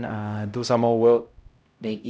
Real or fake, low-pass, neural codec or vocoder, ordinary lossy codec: fake; none; codec, 16 kHz, 0.5 kbps, X-Codec, HuBERT features, trained on LibriSpeech; none